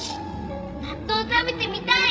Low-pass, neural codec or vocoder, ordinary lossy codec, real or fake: none; codec, 16 kHz, 16 kbps, FreqCodec, larger model; none; fake